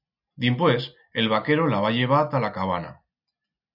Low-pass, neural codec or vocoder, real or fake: 5.4 kHz; none; real